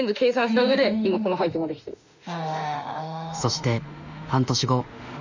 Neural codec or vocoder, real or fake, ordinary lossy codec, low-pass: autoencoder, 48 kHz, 32 numbers a frame, DAC-VAE, trained on Japanese speech; fake; none; 7.2 kHz